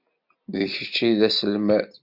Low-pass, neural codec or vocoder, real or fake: 5.4 kHz; none; real